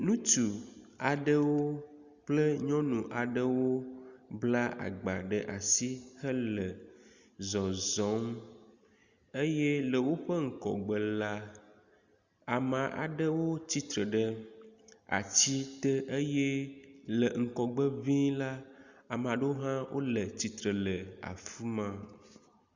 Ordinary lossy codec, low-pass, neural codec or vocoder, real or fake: Opus, 64 kbps; 7.2 kHz; none; real